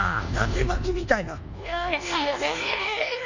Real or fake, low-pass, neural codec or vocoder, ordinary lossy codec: fake; 7.2 kHz; codec, 24 kHz, 1.2 kbps, DualCodec; none